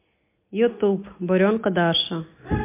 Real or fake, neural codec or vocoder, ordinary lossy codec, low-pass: real; none; MP3, 24 kbps; 3.6 kHz